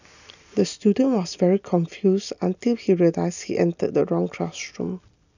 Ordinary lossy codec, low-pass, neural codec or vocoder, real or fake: none; 7.2 kHz; none; real